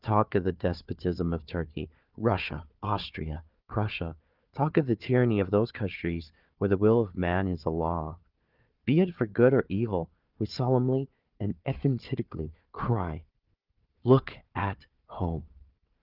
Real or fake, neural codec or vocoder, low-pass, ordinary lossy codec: fake; codec, 16 kHz, 4 kbps, FunCodec, trained on Chinese and English, 50 frames a second; 5.4 kHz; Opus, 32 kbps